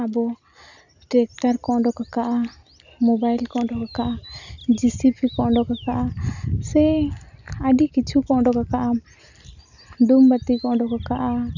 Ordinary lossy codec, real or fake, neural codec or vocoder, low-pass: none; real; none; 7.2 kHz